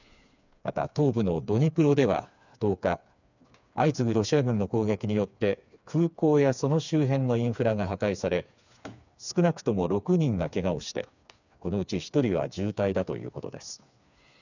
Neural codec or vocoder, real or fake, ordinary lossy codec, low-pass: codec, 16 kHz, 4 kbps, FreqCodec, smaller model; fake; none; 7.2 kHz